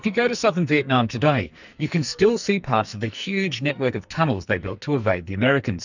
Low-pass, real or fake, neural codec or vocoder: 7.2 kHz; fake; codec, 44.1 kHz, 2.6 kbps, SNAC